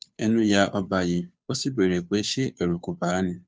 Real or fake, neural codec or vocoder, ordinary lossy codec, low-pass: fake; codec, 16 kHz, 2 kbps, FunCodec, trained on Chinese and English, 25 frames a second; none; none